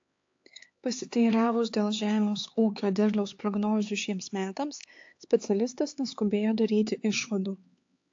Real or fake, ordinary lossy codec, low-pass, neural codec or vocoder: fake; AAC, 48 kbps; 7.2 kHz; codec, 16 kHz, 4 kbps, X-Codec, HuBERT features, trained on LibriSpeech